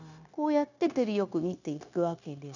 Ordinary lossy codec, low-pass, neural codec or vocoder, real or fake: none; 7.2 kHz; codec, 16 kHz, 0.9 kbps, LongCat-Audio-Codec; fake